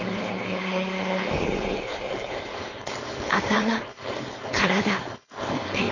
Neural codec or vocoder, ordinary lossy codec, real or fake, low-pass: codec, 16 kHz, 4.8 kbps, FACodec; AAC, 32 kbps; fake; 7.2 kHz